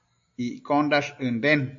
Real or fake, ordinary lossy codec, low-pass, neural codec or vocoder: real; AAC, 64 kbps; 7.2 kHz; none